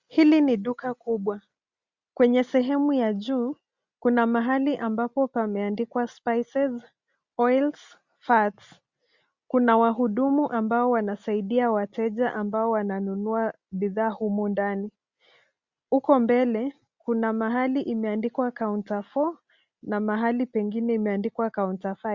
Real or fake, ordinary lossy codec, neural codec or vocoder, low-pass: real; Opus, 64 kbps; none; 7.2 kHz